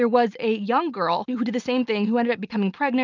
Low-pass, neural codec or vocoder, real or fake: 7.2 kHz; none; real